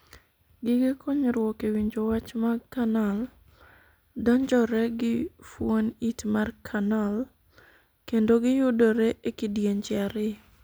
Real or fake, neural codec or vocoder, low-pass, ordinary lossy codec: real; none; none; none